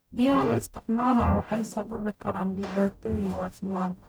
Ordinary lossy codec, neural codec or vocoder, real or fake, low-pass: none; codec, 44.1 kHz, 0.9 kbps, DAC; fake; none